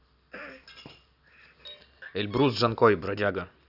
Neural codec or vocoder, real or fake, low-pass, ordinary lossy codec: none; real; 5.4 kHz; none